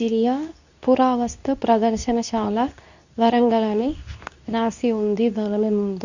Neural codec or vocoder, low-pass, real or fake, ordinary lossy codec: codec, 24 kHz, 0.9 kbps, WavTokenizer, medium speech release version 2; 7.2 kHz; fake; none